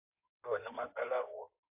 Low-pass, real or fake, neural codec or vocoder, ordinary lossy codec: 3.6 kHz; fake; codec, 16 kHz in and 24 kHz out, 2.2 kbps, FireRedTTS-2 codec; AAC, 32 kbps